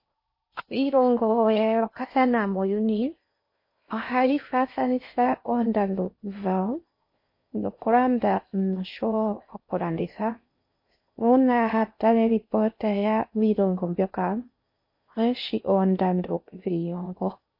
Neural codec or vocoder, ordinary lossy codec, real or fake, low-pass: codec, 16 kHz in and 24 kHz out, 0.6 kbps, FocalCodec, streaming, 4096 codes; MP3, 32 kbps; fake; 5.4 kHz